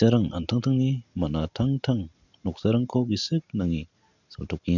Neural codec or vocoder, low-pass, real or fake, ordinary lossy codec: none; 7.2 kHz; real; none